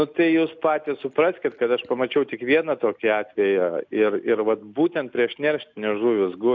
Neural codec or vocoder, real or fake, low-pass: none; real; 7.2 kHz